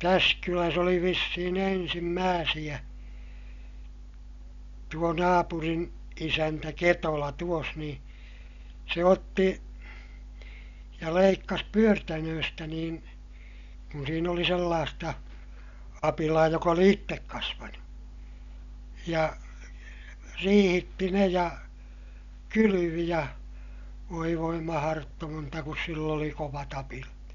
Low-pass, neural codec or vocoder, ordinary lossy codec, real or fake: 7.2 kHz; none; none; real